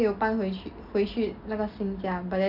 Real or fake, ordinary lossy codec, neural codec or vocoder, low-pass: real; none; none; 5.4 kHz